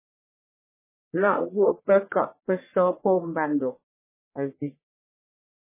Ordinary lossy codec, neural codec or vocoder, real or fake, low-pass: MP3, 16 kbps; codec, 44.1 kHz, 1.7 kbps, Pupu-Codec; fake; 3.6 kHz